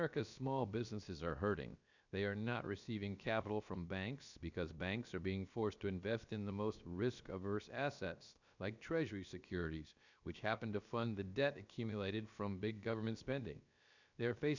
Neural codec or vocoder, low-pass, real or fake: codec, 16 kHz, about 1 kbps, DyCAST, with the encoder's durations; 7.2 kHz; fake